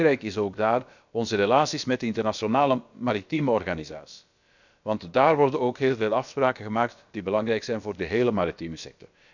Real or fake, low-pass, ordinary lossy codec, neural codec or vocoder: fake; 7.2 kHz; none; codec, 16 kHz, about 1 kbps, DyCAST, with the encoder's durations